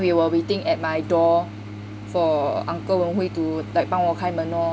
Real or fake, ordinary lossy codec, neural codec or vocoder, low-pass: real; none; none; none